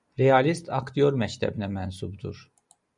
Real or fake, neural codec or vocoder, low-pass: real; none; 10.8 kHz